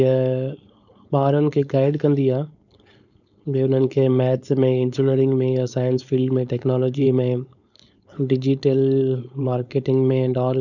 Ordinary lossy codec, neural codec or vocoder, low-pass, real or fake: none; codec, 16 kHz, 4.8 kbps, FACodec; 7.2 kHz; fake